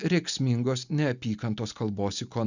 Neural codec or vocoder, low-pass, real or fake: none; 7.2 kHz; real